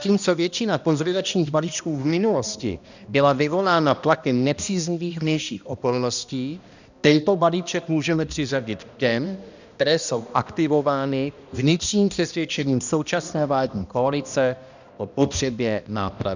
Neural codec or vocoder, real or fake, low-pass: codec, 16 kHz, 1 kbps, X-Codec, HuBERT features, trained on balanced general audio; fake; 7.2 kHz